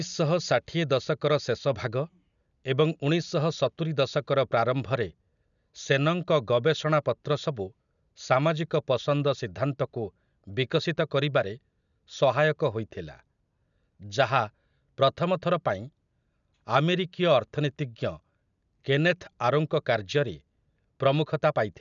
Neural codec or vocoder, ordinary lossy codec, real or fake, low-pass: none; none; real; 7.2 kHz